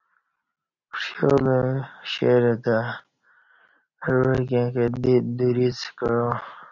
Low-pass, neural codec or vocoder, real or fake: 7.2 kHz; none; real